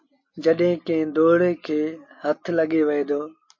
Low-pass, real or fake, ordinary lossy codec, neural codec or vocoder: 7.2 kHz; real; MP3, 32 kbps; none